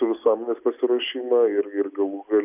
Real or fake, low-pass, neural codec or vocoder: real; 3.6 kHz; none